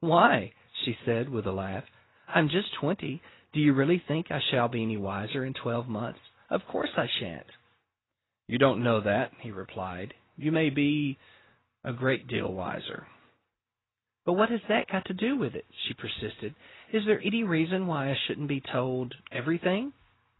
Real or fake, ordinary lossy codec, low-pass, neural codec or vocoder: real; AAC, 16 kbps; 7.2 kHz; none